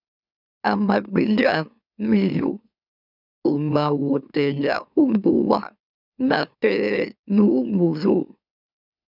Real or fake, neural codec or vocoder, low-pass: fake; autoencoder, 44.1 kHz, a latent of 192 numbers a frame, MeloTTS; 5.4 kHz